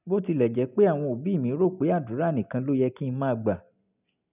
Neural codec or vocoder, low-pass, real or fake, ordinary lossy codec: none; 3.6 kHz; real; none